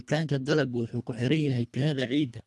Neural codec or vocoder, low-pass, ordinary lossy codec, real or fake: codec, 24 kHz, 1.5 kbps, HILCodec; none; none; fake